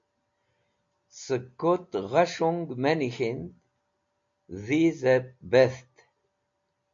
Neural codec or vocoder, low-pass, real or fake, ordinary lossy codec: none; 7.2 kHz; real; MP3, 48 kbps